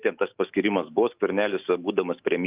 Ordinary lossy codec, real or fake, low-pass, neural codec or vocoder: Opus, 24 kbps; fake; 3.6 kHz; autoencoder, 48 kHz, 128 numbers a frame, DAC-VAE, trained on Japanese speech